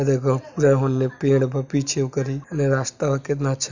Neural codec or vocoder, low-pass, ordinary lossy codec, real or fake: none; 7.2 kHz; none; real